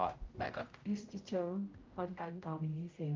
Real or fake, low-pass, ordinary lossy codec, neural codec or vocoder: fake; 7.2 kHz; Opus, 24 kbps; codec, 16 kHz, 0.5 kbps, X-Codec, HuBERT features, trained on general audio